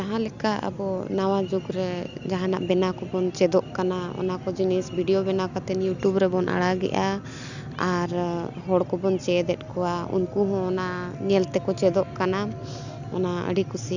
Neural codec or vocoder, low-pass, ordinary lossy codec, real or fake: none; 7.2 kHz; none; real